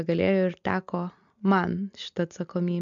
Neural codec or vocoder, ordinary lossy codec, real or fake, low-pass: none; MP3, 64 kbps; real; 7.2 kHz